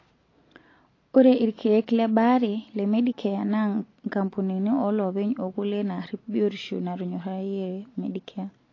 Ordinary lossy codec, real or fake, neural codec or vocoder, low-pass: AAC, 32 kbps; real; none; 7.2 kHz